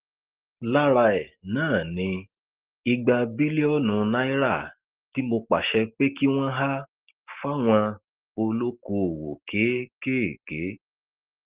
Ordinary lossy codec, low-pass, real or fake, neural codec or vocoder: Opus, 16 kbps; 3.6 kHz; real; none